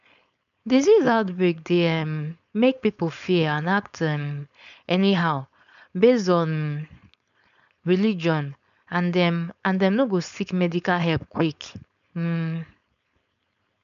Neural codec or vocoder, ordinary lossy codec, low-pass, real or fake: codec, 16 kHz, 4.8 kbps, FACodec; none; 7.2 kHz; fake